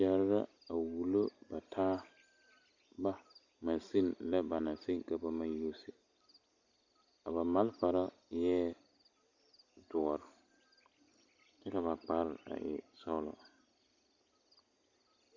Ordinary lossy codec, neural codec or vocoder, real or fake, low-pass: Opus, 64 kbps; none; real; 7.2 kHz